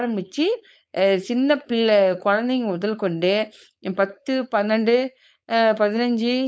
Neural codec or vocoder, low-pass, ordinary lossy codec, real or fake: codec, 16 kHz, 4.8 kbps, FACodec; none; none; fake